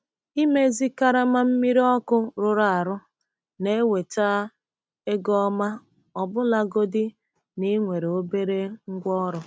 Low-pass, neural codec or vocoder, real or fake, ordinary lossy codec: none; none; real; none